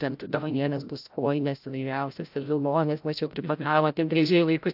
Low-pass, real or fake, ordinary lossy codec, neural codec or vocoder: 5.4 kHz; fake; AAC, 48 kbps; codec, 16 kHz, 0.5 kbps, FreqCodec, larger model